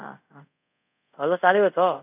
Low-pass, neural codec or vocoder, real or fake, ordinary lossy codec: 3.6 kHz; codec, 24 kHz, 0.5 kbps, DualCodec; fake; none